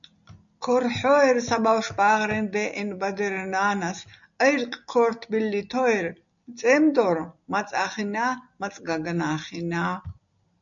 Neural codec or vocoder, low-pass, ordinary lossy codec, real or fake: none; 7.2 kHz; MP3, 96 kbps; real